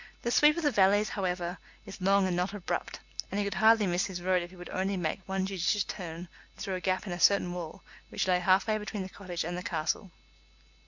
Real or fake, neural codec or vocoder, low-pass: real; none; 7.2 kHz